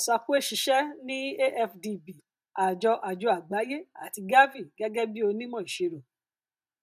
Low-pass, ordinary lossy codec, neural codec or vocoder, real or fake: 14.4 kHz; none; none; real